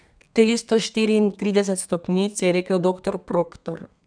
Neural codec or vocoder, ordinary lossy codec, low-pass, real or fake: codec, 32 kHz, 1.9 kbps, SNAC; none; 9.9 kHz; fake